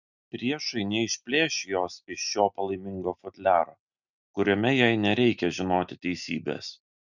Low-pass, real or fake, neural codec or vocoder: 7.2 kHz; real; none